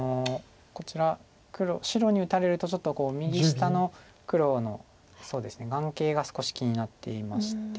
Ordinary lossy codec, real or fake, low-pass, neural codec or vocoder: none; real; none; none